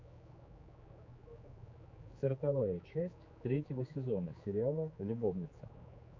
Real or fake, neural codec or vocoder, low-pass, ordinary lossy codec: fake; codec, 16 kHz, 4 kbps, X-Codec, HuBERT features, trained on general audio; 7.2 kHz; MP3, 48 kbps